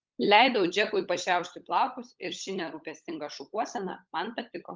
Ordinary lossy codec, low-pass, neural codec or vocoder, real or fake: Opus, 32 kbps; 7.2 kHz; codec, 16 kHz, 16 kbps, FunCodec, trained on LibriTTS, 50 frames a second; fake